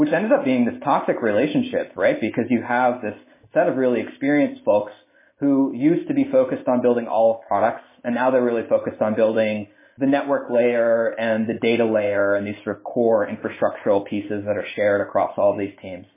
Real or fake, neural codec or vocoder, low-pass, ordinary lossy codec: real; none; 3.6 kHz; MP3, 16 kbps